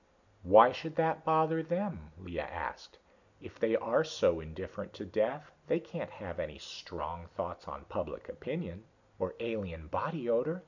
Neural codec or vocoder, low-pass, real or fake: none; 7.2 kHz; real